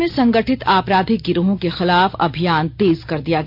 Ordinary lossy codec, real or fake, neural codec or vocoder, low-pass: none; real; none; 5.4 kHz